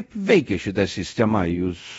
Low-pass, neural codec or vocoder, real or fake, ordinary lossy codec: 10.8 kHz; codec, 24 kHz, 0.5 kbps, DualCodec; fake; AAC, 24 kbps